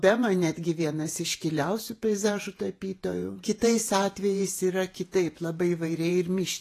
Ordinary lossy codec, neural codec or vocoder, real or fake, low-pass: AAC, 48 kbps; vocoder, 48 kHz, 128 mel bands, Vocos; fake; 14.4 kHz